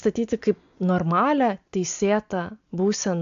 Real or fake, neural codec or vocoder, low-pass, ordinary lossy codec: real; none; 7.2 kHz; AAC, 64 kbps